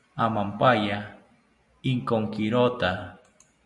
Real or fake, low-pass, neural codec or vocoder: real; 10.8 kHz; none